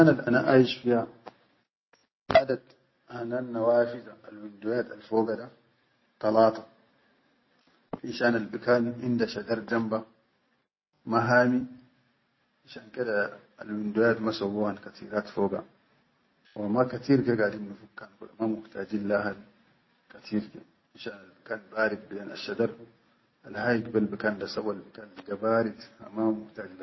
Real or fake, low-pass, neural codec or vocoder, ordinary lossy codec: real; 7.2 kHz; none; MP3, 24 kbps